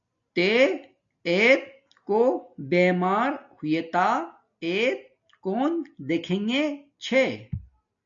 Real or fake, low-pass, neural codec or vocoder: real; 7.2 kHz; none